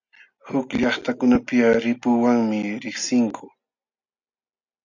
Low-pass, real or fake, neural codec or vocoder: 7.2 kHz; real; none